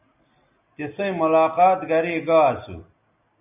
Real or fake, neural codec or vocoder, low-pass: real; none; 3.6 kHz